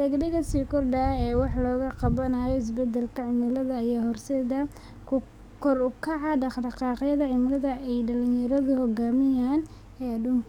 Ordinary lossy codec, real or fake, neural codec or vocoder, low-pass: none; fake; codec, 44.1 kHz, 7.8 kbps, DAC; 19.8 kHz